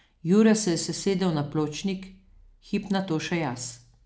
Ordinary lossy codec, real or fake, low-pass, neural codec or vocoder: none; real; none; none